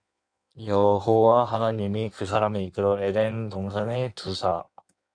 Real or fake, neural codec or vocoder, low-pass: fake; codec, 16 kHz in and 24 kHz out, 1.1 kbps, FireRedTTS-2 codec; 9.9 kHz